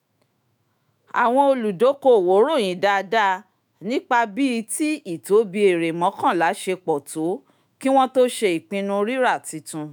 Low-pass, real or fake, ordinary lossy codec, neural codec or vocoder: none; fake; none; autoencoder, 48 kHz, 128 numbers a frame, DAC-VAE, trained on Japanese speech